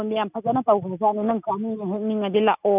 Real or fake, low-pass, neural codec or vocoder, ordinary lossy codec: real; 3.6 kHz; none; none